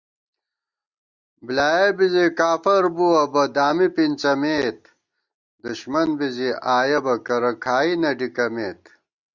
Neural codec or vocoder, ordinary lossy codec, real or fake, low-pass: none; Opus, 64 kbps; real; 7.2 kHz